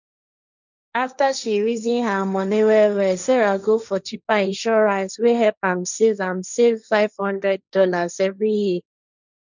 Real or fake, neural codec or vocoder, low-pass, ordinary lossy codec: fake; codec, 16 kHz, 1.1 kbps, Voila-Tokenizer; 7.2 kHz; none